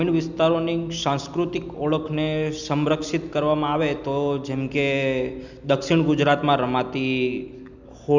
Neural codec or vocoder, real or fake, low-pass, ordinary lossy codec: none; real; 7.2 kHz; none